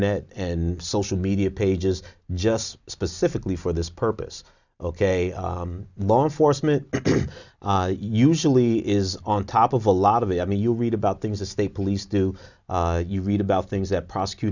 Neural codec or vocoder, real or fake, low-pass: none; real; 7.2 kHz